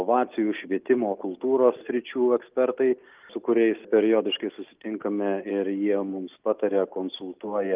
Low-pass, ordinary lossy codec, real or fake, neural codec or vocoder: 3.6 kHz; Opus, 32 kbps; real; none